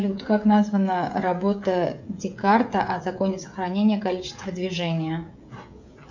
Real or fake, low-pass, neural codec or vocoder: fake; 7.2 kHz; codec, 16 kHz, 16 kbps, FreqCodec, smaller model